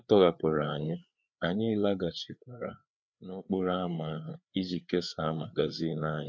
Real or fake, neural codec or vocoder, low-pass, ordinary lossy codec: fake; codec, 16 kHz, 4 kbps, FreqCodec, larger model; none; none